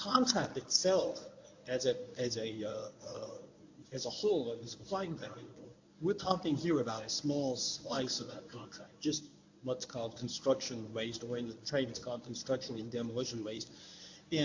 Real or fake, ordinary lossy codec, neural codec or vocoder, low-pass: fake; AAC, 48 kbps; codec, 24 kHz, 0.9 kbps, WavTokenizer, medium speech release version 1; 7.2 kHz